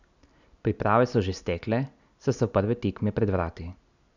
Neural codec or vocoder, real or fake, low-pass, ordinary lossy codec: none; real; 7.2 kHz; none